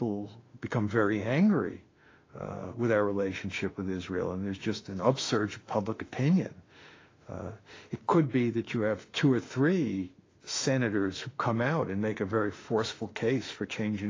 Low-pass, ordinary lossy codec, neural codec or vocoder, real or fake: 7.2 kHz; AAC, 32 kbps; autoencoder, 48 kHz, 32 numbers a frame, DAC-VAE, trained on Japanese speech; fake